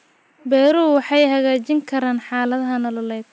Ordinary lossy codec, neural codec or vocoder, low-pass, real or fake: none; none; none; real